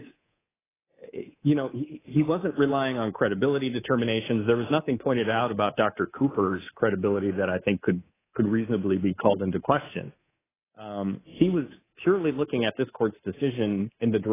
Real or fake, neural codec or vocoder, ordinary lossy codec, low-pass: fake; codec, 24 kHz, 3.1 kbps, DualCodec; AAC, 16 kbps; 3.6 kHz